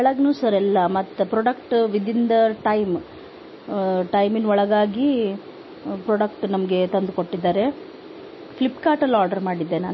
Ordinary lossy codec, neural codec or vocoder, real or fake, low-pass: MP3, 24 kbps; none; real; 7.2 kHz